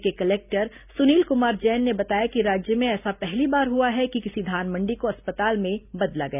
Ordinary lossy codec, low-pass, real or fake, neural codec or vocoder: none; 3.6 kHz; real; none